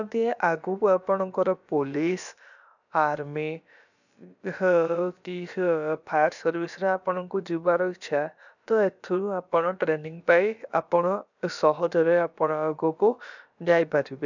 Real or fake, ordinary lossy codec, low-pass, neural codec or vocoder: fake; none; 7.2 kHz; codec, 16 kHz, about 1 kbps, DyCAST, with the encoder's durations